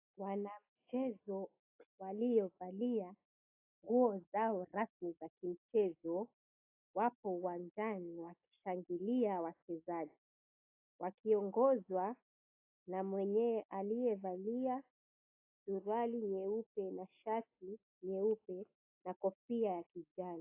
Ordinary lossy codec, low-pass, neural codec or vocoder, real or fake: AAC, 24 kbps; 3.6 kHz; none; real